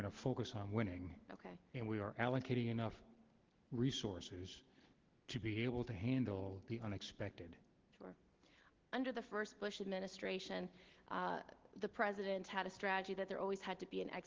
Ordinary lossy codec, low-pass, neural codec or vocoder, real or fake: Opus, 16 kbps; 7.2 kHz; none; real